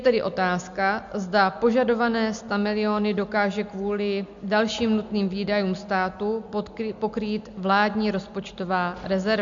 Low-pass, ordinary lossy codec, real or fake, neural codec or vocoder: 7.2 kHz; MP3, 64 kbps; real; none